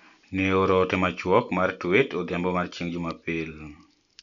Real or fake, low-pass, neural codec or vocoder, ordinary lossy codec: real; 7.2 kHz; none; none